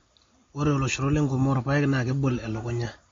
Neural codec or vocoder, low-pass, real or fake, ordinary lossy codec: none; 7.2 kHz; real; AAC, 32 kbps